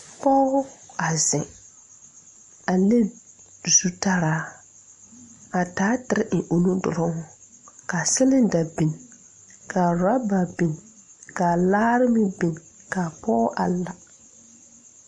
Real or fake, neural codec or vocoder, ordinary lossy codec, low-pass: real; none; MP3, 48 kbps; 14.4 kHz